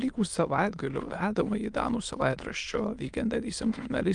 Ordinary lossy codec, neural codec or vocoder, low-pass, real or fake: Opus, 32 kbps; autoencoder, 22.05 kHz, a latent of 192 numbers a frame, VITS, trained on many speakers; 9.9 kHz; fake